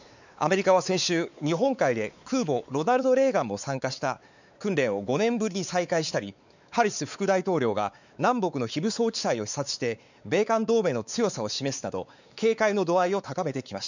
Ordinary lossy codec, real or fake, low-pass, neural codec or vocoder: none; fake; 7.2 kHz; codec, 16 kHz, 4 kbps, X-Codec, WavLM features, trained on Multilingual LibriSpeech